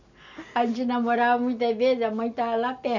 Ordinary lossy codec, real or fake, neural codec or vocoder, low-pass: none; real; none; 7.2 kHz